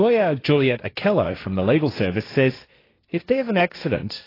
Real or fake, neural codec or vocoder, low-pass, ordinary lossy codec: fake; codec, 16 kHz, 1.1 kbps, Voila-Tokenizer; 5.4 kHz; AAC, 24 kbps